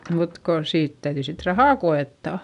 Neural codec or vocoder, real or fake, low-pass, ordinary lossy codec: none; real; 10.8 kHz; none